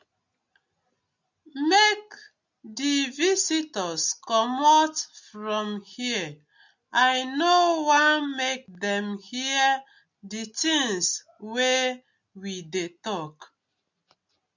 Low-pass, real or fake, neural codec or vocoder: 7.2 kHz; real; none